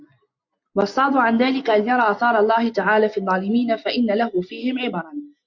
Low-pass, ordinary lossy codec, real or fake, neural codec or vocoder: 7.2 kHz; MP3, 48 kbps; real; none